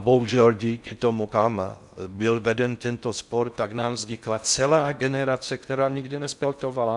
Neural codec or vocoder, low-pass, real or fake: codec, 16 kHz in and 24 kHz out, 0.6 kbps, FocalCodec, streaming, 4096 codes; 10.8 kHz; fake